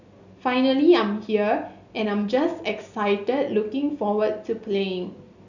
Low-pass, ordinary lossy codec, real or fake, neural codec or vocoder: 7.2 kHz; none; real; none